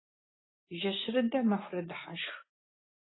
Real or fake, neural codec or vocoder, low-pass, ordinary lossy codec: real; none; 7.2 kHz; AAC, 16 kbps